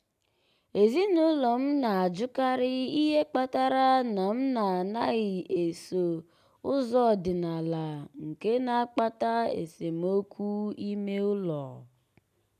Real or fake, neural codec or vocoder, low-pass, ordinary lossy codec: real; none; 14.4 kHz; none